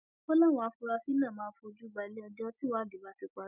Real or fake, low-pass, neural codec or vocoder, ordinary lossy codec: real; 3.6 kHz; none; none